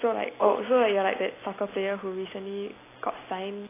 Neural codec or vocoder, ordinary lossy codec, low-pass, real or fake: none; AAC, 16 kbps; 3.6 kHz; real